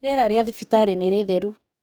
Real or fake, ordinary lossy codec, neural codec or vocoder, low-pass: fake; none; codec, 44.1 kHz, 2.6 kbps, DAC; none